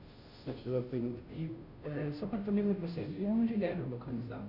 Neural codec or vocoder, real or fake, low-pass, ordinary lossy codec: codec, 16 kHz, 0.5 kbps, FunCodec, trained on Chinese and English, 25 frames a second; fake; 5.4 kHz; none